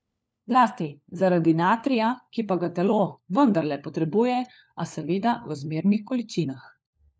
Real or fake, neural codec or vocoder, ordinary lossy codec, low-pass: fake; codec, 16 kHz, 4 kbps, FunCodec, trained on LibriTTS, 50 frames a second; none; none